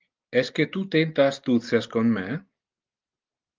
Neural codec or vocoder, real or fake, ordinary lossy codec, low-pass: none; real; Opus, 32 kbps; 7.2 kHz